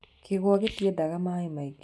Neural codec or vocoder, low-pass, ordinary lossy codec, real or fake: none; none; none; real